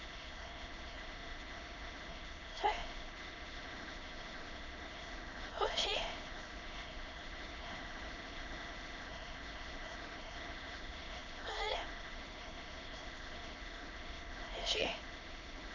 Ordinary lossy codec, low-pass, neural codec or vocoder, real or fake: none; 7.2 kHz; autoencoder, 22.05 kHz, a latent of 192 numbers a frame, VITS, trained on many speakers; fake